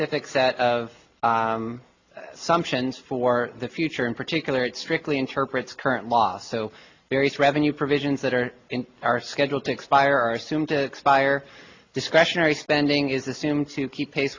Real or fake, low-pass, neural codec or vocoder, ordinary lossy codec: real; 7.2 kHz; none; AAC, 48 kbps